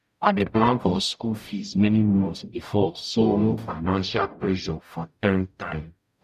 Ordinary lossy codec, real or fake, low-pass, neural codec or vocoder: none; fake; 14.4 kHz; codec, 44.1 kHz, 0.9 kbps, DAC